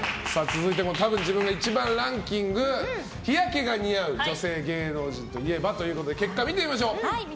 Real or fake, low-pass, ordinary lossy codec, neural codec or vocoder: real; none; none; none